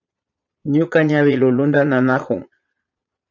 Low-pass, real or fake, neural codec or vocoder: 7.2 kHz; fake; vocoder, 22.05 kHz, 80 mel bands, Vocos